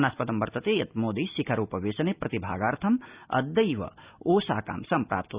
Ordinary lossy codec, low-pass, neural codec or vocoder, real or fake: Opus, 64 kbps; 3.6 kHz; none; real